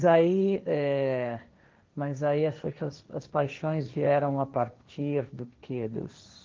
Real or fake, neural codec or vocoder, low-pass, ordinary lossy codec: fake; codec, 16 kHz, 1.1 kbps, Voila-Tokenizer; 7.2 kHz; Opus, 32 kbps